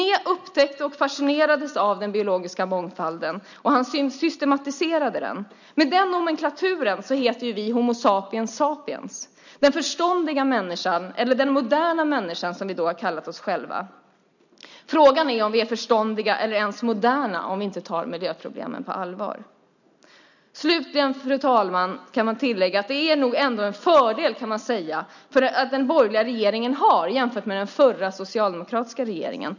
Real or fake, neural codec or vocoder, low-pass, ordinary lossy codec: real; none; 7.2 kHz; none